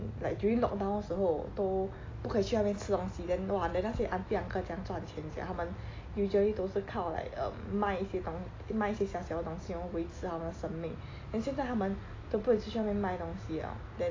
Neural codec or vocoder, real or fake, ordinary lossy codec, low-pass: none; real; AAC, 32 kbps; 7.2 kHz